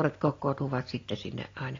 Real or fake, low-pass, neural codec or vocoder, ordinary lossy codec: real; 7.2 kHz; none; AAC, 32 kbps